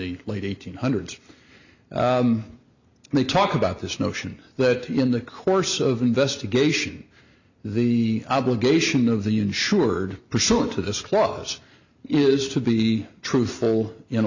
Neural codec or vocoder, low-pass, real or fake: none; 7.2 kHz; real